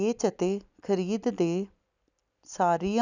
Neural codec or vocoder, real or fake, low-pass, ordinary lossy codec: none; real; 7.2 kHz; none